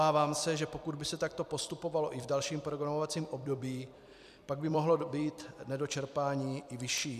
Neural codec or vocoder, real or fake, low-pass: vocoder, 44.1 kHz, 128 mel bands every 256 samples, BigVGAN v2; fake; 14.4 kHz